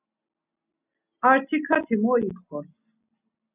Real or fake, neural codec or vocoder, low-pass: real; none; 3.6 kHz